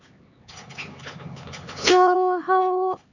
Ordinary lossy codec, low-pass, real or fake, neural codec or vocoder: none; 7.2 kHz; fake; codec, 16 kHz, 4 kbps, X-Codec, HuBERT features, trained on LibriSpeech